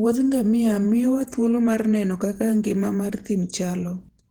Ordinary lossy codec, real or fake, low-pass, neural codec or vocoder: Opus, 16 kbps; fake; 19.8 kHz; vocoder, 48 kHz, 128 mel bands, Vocos